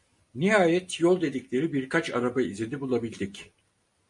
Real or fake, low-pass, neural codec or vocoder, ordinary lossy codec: real; 10.8 kHz; none; MP3, 48 kbps